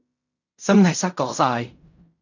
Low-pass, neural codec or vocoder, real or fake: 7.2 kHz; codec, 16 kHz in and 24 kHz out, 0.4 kbps, LongCat-Audio-Codec, fine tuned four codebook decoder; fake